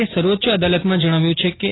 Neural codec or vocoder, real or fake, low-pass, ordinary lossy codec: none; real; 7.2 kHz; AAC, 16 kbps